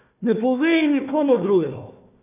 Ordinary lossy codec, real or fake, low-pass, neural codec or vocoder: none; fake; 3.6 kHz; codec, 16 kHz, 1 kbps, FunCodec, trained on Chinese and English, 50 frames a second